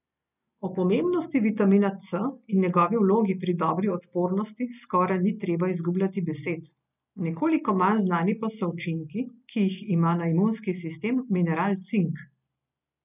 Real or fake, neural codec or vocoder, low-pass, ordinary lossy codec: real; none; 3.6 kHz; none